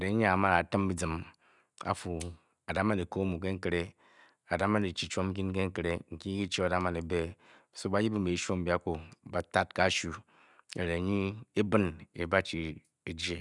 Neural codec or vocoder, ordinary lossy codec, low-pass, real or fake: none; none; 10.8 kHz; real